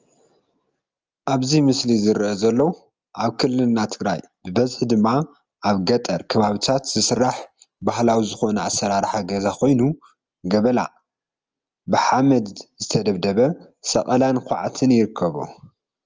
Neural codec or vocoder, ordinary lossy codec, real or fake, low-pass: none; Opus, 24 kbps; real; 7.2 kHz